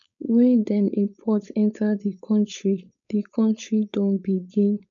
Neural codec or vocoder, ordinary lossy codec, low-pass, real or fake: codec, 16 kHz, 4.8 kbps, FACodec; none; 7.2 kHz; fake